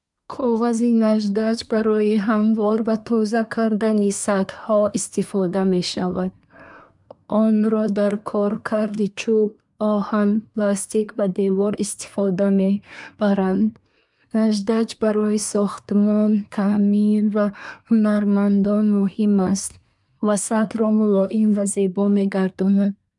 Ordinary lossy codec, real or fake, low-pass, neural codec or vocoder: none; fake; 10.8 kHz; codec, 24 kHz, 1 kbps, SNAC